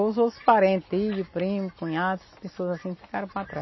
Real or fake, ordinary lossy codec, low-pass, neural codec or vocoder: real; MP3, 24 kbps; 7.2 kHz; none